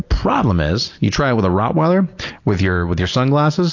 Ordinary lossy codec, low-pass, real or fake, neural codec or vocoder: AAC, 48 kbps; 7.2 kHz; real; none